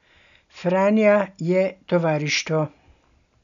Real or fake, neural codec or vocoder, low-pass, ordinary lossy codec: real; none; 7.2 kHz; none